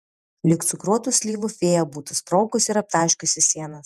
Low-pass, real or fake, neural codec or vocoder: 14.4 kHz; fake; vocoder, 48 kHz, 128 mel bands, Vocos